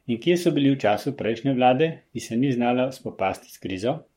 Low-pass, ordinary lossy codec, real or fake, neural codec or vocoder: 19.8 kHz; MP3, 64 kbps; fake; codec, 44.1 kHz, 7.8 kbps, Pupu-Codec